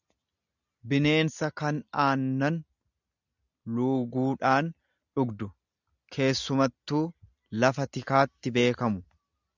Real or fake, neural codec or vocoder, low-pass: real; none; 7.2 kHz